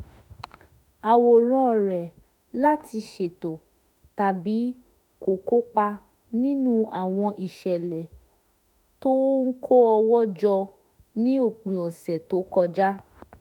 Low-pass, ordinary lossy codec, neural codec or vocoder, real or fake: 19.8 kHz; none; autoencoder, 48 kHz, 32 numbers a frame, DAC-VAE, trained on Japanese speech; fake